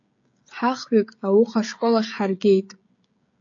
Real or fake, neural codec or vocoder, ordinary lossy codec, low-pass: fake; codec, 16 kHz, 16 kbps, FreqCodec, smaller model; AAC, 48 kbps; 7.2 kHz